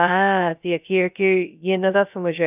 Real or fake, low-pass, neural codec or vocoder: fake; 3.6 kHz; codec, 16 kHz, 0.2 kbps, FocalCodec